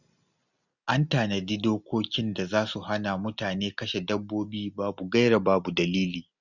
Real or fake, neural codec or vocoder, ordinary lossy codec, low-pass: real; none; none; 7.2 kHz